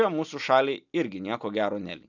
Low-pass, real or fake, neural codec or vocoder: 7.2 kHz; real; none